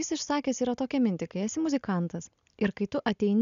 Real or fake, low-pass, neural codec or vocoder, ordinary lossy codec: real; 7.2 kHz; none; MP3, 96 kbps